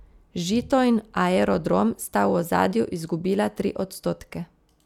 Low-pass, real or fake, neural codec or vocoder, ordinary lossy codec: 19.8 kHz; real; none; none